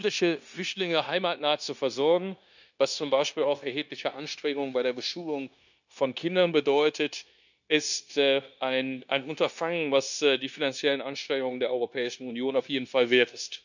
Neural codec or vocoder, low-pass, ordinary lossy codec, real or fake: codec, 16 kHz, 0.9 kbps, LongCat-Audio-Codec; 7.2 kHz; none; fake